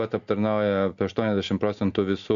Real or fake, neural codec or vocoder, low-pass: real; none; 7.2 kHz